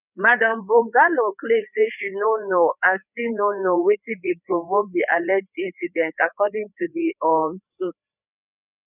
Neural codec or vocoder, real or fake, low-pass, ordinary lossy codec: codec, 16 kHz, 4 kbps, FreqCodec, larger model; fake; 3.6 kHz; none